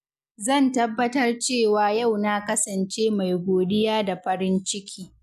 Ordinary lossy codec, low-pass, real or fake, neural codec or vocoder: none; 14.4 kHz; real; none